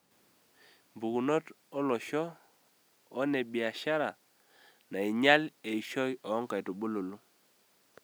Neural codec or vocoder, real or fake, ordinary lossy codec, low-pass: none; real; none; none